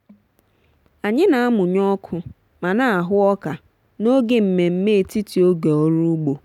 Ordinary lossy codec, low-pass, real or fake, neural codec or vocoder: none; 19.8 kHz; real; none